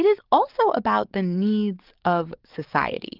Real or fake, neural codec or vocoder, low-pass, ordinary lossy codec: fake; codec, 44.1 kHz, 7.8 kbps, DAC; 5.4 kHz; Opus, 24 kbps